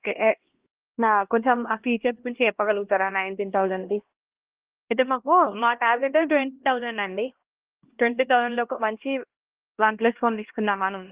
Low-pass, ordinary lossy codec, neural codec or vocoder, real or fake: 3.6 kHz; Opus, 16 kbps; codec, 16 kHz, 1 kbps, X-Codec, HuBERT features, trained on LibriSpeech; fake